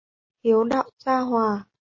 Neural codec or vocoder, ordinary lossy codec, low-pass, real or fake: none; MP3, 32 kbps; 7.2 kHz; real